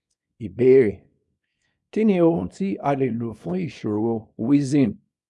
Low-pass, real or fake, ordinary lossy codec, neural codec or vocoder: none; fake; none; codec, 24 kHz, 0.9 kbps, WavTokenizer, small release